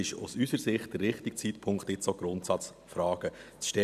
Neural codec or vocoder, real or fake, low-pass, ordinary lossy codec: none; real; 14.4 kHz; none